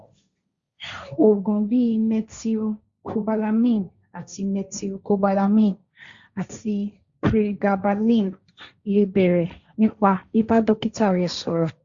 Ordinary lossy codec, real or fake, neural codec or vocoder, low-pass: Opus, 64 kbps; fake; codec, 16 kHz, 1.1 kbps, Voila-Tokenizer; 7.2 kHz